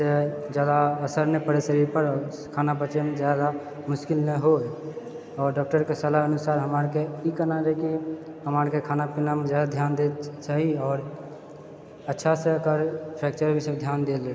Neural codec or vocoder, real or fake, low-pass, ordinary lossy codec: none; real; none; none